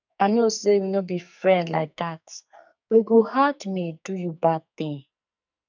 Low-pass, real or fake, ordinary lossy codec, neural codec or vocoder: 7.2 kHz; fake; none; codec, 44.1 kHz, 2.6 kbps, SNAC